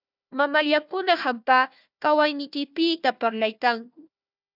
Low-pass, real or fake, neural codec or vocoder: 5.4 kHz; fake; codec, 16 kHz, 1 kbps, FunCodec, trained on Chinese and English, 50 frames a second